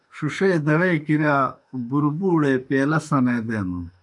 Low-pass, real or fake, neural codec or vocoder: 10.8 kHz; fake; autoencoder, 48 kHz, 32 numbers a frame, DAC-VAE, trained on Japanese speech